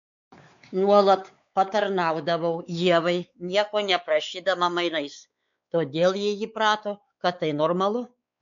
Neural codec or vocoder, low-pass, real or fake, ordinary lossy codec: codec, 16 kHz, 4 kbps, X-Codec, WavLM features, trained on Multilingual LibriSpeech; 7.2 kHz; fake; MP3, 64 kbps